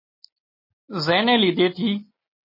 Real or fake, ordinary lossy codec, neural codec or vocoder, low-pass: real; MP3, 24 kbps; none; 5.4 kHz